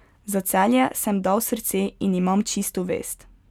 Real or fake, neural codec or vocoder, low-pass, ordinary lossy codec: real; none; 19.8 kHz; none